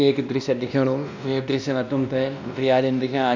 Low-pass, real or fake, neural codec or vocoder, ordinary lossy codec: 7.2 kHz; fake; codec, 16 kHz, 1 kbps, X-Codec, WavLM features, trained on Multilingual LibriSpeech; none